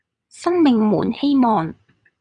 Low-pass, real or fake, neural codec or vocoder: 9.9 kHz; fake; vocoder, 22.05 kHz, 80 mel bands, WaveNeXt